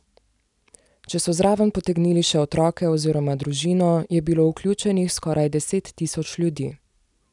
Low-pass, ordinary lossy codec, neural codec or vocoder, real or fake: 10.8 kHz; none; none; real